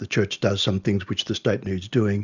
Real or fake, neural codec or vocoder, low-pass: real; none; 7.2 kHz